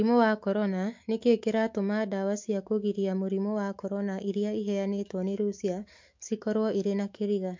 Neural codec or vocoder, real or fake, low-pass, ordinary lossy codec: autoencoder, 48 kHz, 128 numbers a frame, DAC-VAE, trained on Japanese speech; fake; 7.2 kHz; MP3, 48 kbps